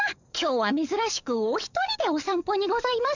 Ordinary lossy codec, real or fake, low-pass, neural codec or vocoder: none; fake; 7.2 kHz; codec, 44.1 kHz, 7.8 kbps, DAC